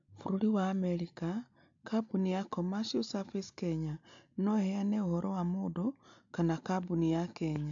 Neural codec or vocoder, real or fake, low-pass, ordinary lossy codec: none; real; 7.2 kHz; AAC, 64 kbps